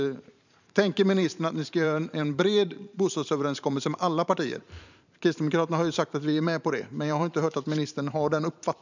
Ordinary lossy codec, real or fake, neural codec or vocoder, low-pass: none; fake; vocoder, 44.1 kHz, 128 mel bands every 512 samples, BigVGAN v2; 7.2 kHz